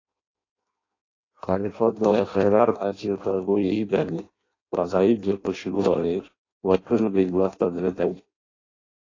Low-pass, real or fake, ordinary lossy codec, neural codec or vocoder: 7.2 kHz; fake; AAC, 32 kbps; codec, 16 kHz in and 24 kHz out, 0.6 kbps, FireRedTTS-2 codec